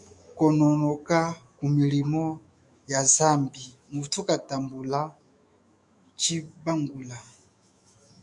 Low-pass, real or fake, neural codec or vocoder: 10.8 kHz; fake; autoencoder, 48 kHz, 128 numbers a frame, DAC-VAE, trained on Japanese speech